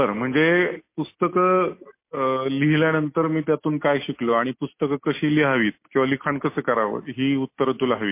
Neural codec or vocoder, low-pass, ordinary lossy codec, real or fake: none; 3.6 kHz; MP3, 24 kbps; real